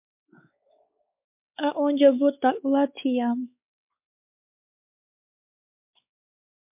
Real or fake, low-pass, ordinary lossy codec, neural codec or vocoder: fake; 3.6 kHz; MP3, 32 kbps; codec, 16 kHz, 4 kbps, X-Codec, WavLM features, trained on Multilingual LibriSpeech